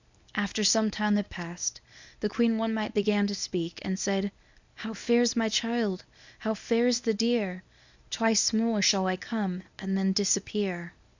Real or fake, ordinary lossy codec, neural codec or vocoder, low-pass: fake; Opus, 64 kbps; codec, 24 kHz, 0.9 kbps, WavTokenizer, medium speech release version 1; 7.2 kHz